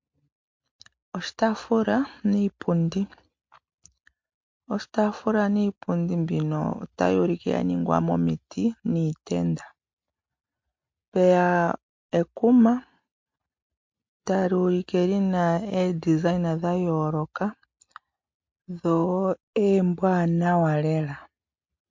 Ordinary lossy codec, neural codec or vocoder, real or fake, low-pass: MP3, 48 kbps; none; real; 7.2 kHz